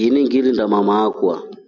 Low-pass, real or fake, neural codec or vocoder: 7.2 kHz; real; none